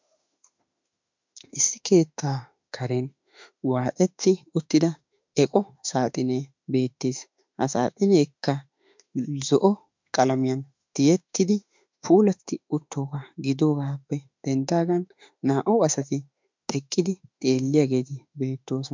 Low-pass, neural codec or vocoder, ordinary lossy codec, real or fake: 7.2 kHz; autoencoder, 48 kHz, 32 numbers a frame, DAC-VAE, trained on Japanese speech; MP3, 64 kbps; fake